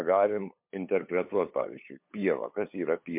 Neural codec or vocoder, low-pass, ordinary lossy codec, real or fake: codec, 16 kHz, 8 kbps, FunCodec, trained on LibriTTS, 25 frames a second; 3.6 kHz; MP3, 32 kbps; fake